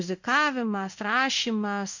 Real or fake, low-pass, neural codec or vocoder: fake; 7.2 kHz; codec, 16 kHz in and 24 kHz out, 1 kbps, XY-Tokenizer